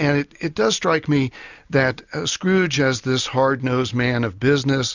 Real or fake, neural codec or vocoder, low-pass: real; none; 7.2 kHz